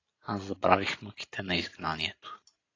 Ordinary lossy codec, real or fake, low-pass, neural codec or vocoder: AAC, 32 kbps; real; 7.2 kHz; none